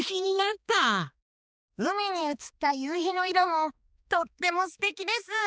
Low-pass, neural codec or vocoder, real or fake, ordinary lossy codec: none; codec, 16 kHz, 2 kbps, X-Codec, HuBERT features, trained on balanced general audio; fake; none